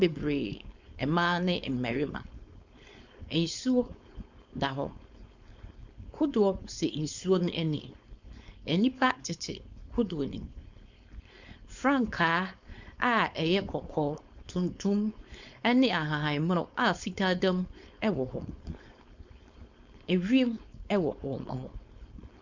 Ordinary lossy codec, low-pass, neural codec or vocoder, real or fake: Opus, 64 kbps; 7.2 kHz; codec, 16 kHz, 4.8 kbps, FACodec; fake